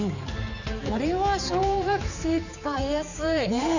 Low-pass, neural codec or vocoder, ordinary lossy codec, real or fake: 7.2 kHz; codec, 16 kHz, 4 kbps, X-Codec, HuBERT features, trained on general audio; none; fake